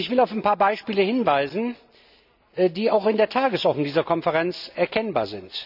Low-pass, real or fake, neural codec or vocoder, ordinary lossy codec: 5.4 kHz; real; none; none